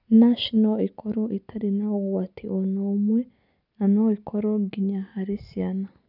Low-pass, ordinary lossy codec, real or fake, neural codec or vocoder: 5.4 kHz; none; real; none